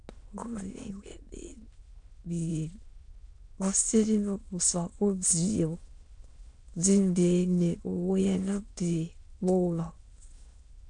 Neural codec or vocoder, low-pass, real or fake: autoencoder, 22.05 kHz, a latent of 192 numbers a frame, VITS, trained on many speakers; 9.9 kHz; fake